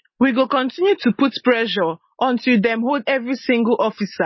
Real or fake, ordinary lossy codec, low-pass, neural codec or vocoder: real; MP3, 24 kbps; 7.2 kHz; none